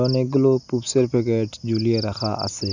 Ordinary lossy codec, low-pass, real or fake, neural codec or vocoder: none; 7.2 kHz; real; none